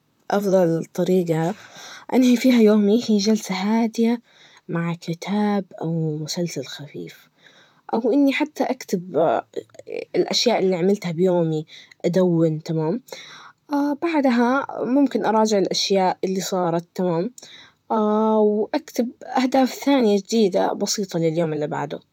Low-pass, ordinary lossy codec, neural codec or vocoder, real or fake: 19.8 kHz; none; vocoder, 44.1 kHz, 128 mel bands, Pupu-Vocoder; fake